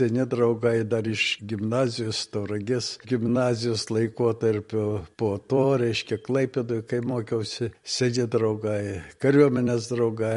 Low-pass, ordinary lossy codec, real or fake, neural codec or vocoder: 14.4 kHz; MP3, 48 kbps; fake; vocoder, 44.1 kHz, 128 mel bands every 256 samples, BigVGAN v2